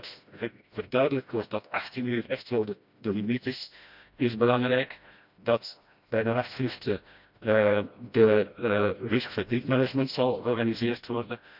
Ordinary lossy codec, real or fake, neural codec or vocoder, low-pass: none; fake; codec, 16 kHz, 1 kbps, FreqCodec, smaller model; 5.4 kHz